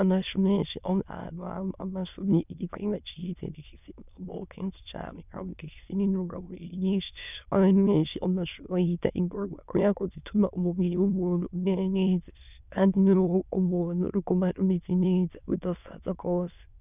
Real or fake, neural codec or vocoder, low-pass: fake; autoencoder, 22.05 kHz, a latent of 192 numbers a frame, VITS, trained on many speakers; 3.6 kHz